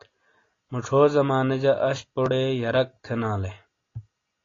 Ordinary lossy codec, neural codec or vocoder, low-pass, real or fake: AAC, 32 kbps; none; 7.2 kHz; real